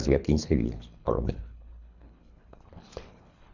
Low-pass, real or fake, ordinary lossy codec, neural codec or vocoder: 7.2 kHz; fake; none; codec, 24 kHz, 3 kbps, HILCodec